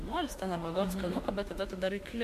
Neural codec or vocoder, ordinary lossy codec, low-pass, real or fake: autoencoder, 48 kHz, 32 numbers a frame, DAC-VAE, trained on Japanese speech; MP3, 64 kbps; 14.4 kHz; fake